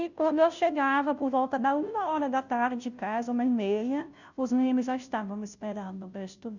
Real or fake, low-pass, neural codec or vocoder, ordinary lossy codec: fake; 7.2 kHz; codec, 16 kHz, 0.5 kbps, FunCodec, trained on Chinese and English, 25 frames a second; none